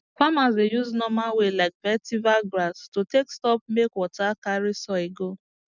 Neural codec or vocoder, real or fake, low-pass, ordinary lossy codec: none; real; 7.2 kHz; none